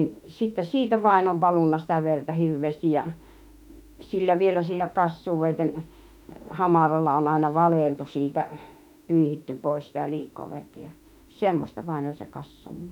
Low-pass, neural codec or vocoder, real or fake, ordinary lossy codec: 19.8 kHz; autoencoder, 48 kHz, 32 numbers a frame, DAC-VAE, trained on Japanese speech; fake; none